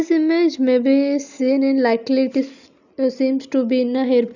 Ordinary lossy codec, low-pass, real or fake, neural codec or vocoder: none; 7.2 kHz; real; none